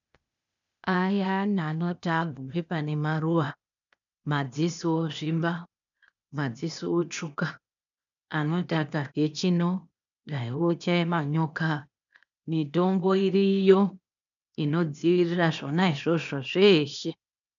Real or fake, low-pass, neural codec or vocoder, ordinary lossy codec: fake; 7.2 kHz; codec, 16 kHz, 0.8 kbps, ZipCodec; MP3, 96 kbps